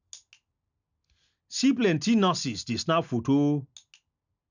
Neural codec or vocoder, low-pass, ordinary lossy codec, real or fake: none; 7.2 kHz; none; real